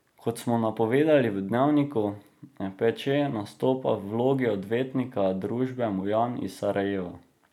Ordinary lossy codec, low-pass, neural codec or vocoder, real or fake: none; 19.8 kHz; none; real